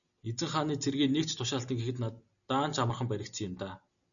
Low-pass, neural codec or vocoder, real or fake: 7.2 kHz; none; real